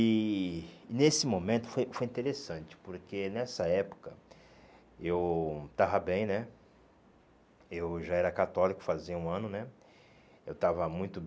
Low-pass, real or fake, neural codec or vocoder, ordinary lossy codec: none; real; none; none